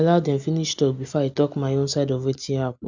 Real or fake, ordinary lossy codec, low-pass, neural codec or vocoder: real; none; 7.2 kHz; none